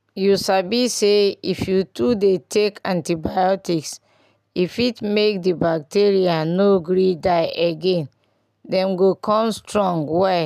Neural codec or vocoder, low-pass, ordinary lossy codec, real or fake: none; 14.4 kHz; none; real